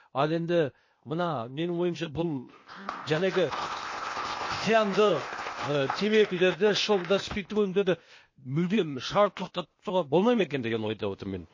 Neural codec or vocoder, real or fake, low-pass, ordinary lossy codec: codec, 16 kHz, 0.8 kbps, ZipCodec; fake; 7.2 kHz; MP3, 32 kbps